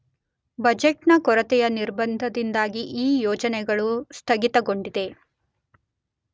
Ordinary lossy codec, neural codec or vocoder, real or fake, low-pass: none; none; real; none